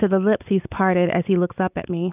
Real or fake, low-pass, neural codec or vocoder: real; 3.6 kHz; none